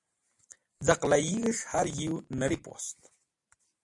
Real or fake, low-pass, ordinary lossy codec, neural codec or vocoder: fake; 10.8 kHz; AAC, 64 kbps; vocoder, 44.1 kHz, 128 mel bands every 256 samples, BigVGAN v2